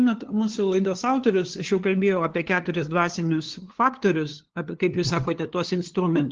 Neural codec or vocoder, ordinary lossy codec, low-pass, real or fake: codec, 16 kHz, 2 kbps, FunCodec, trained on LibriTTS, 25 frames a second; Opus, 16 kbps; 7.2 kHz; fake